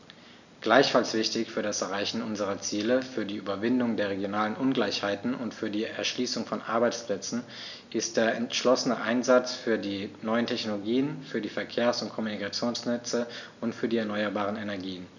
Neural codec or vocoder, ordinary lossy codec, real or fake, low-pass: none; none; real; 7.2 kHz